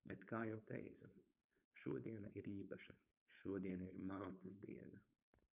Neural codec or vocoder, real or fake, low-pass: codec, 16 kHz, 4.8 kbps, FACodec; fake; 3.6 kHz